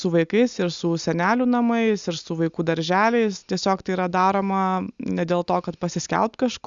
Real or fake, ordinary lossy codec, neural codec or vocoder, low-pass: real; Opus, 64 kbps; none; 7.2 kHz